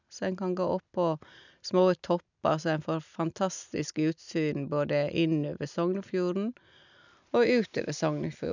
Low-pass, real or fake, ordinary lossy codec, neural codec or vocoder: 7.2 kHz; real; none; none